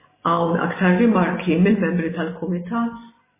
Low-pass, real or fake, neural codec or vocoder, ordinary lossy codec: 3.6 kHz; real; none; MP3, 16 kbps